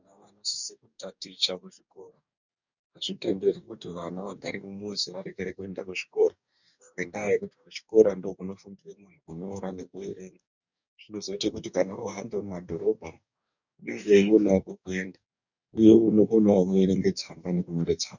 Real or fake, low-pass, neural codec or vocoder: fake; 7.2 kHz; codec, 44.1 kHz, 2.6 kbps, DAC